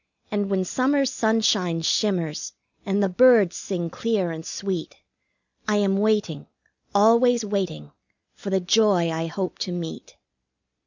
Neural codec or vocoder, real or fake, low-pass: none; real; 7.2 kHz